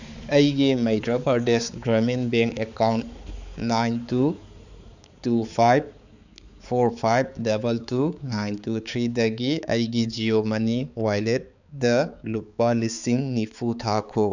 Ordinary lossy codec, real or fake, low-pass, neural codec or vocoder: none; fake; 7.2 kHz; codec, 16 kHz, 4 kbps, X-Codec, HuBERT features, trained on balanced general audio